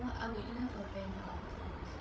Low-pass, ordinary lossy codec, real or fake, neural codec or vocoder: none; none; fake; codec, 16 kHz, 16 kbps, FreqCodec, larger model